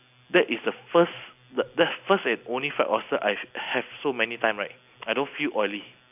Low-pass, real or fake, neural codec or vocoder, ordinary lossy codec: 3.6 kHz; real; none; none